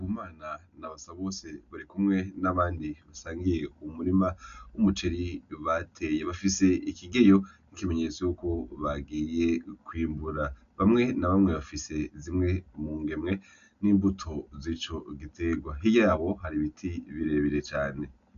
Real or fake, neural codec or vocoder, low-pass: real; none; 7.2 kHz